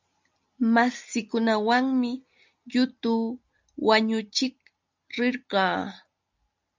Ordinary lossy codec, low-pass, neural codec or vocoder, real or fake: MP3, 64 kbps; 7.2 kHz; none; real